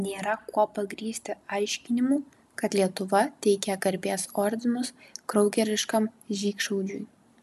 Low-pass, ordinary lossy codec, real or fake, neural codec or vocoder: 14.4 kHz; MP3, 96 kbps; real; none